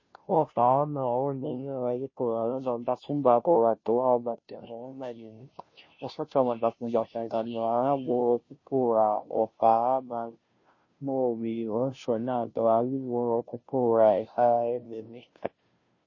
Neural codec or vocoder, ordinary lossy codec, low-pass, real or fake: codec, 16 kHz, 0.5 kbps, FunCodec, trained on Chinese and English, 25 frames a second; MP3, 32 kbps; 7.2 kHz; fake